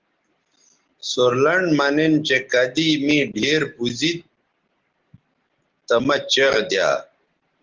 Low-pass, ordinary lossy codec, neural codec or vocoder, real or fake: 7.2 kHz; Opus, 16 kbps; none; real